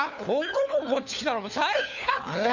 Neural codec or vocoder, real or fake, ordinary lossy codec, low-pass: codec, 16 kHz, 4 kbps, FunCodec, trained on LibriTTS, 50 frames a second; fake; none; 7.2 kHz